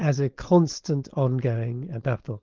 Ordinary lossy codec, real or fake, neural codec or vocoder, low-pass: Opus, 16 kbps; fake; codec, 16 kHz, 4.8 kbps, FACodec; 7.2 kHz